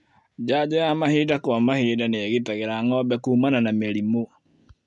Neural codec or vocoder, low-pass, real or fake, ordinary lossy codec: none; 10.8 kHz; real; none